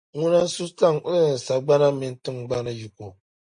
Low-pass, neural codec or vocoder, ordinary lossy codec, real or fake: 9.9 kHz; none; MP3, 48 kbps; real